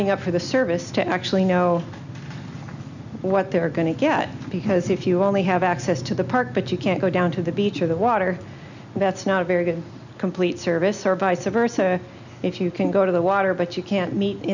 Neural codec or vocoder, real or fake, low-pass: none; real; 7.2 kHz